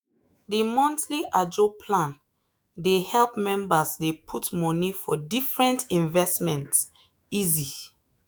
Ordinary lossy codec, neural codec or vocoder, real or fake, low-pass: none; autoencoder, 48 kHz, 128 numbers a frame, DAC-VAE, trained on Japanese speech; fake; none